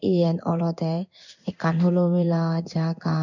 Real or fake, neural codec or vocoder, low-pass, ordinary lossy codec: fake; codec, 16 kHz in and 24 kHz out, 1 kbps, XY-Tokenizer; 7.2 kHz; none